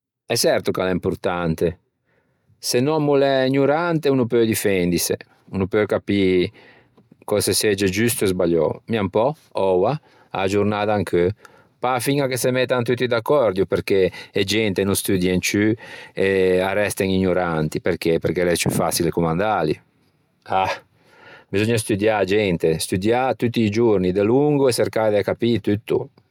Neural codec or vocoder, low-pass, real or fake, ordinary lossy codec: none; 19.8 kHz; real; none